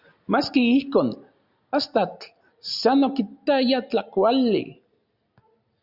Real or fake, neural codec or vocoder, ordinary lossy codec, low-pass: real; none; Opus, 64 kbps; 5.4 kHz